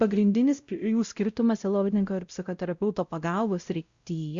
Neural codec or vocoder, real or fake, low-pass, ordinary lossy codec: codec, 16 kHz, 0.5 kbps, X-Codec, WavLM features, trained on Multilingual LibriSpeech; fake; 7.2 kHz; Opus, 64 kbps